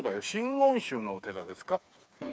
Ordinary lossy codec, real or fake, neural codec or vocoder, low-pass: none; fake; codec, 16 kHz, 4 kbps, FreqCodec, smaller model; none